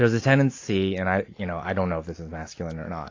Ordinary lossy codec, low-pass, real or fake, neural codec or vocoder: AAC, 32 kbps; 7.2 kHz; real; none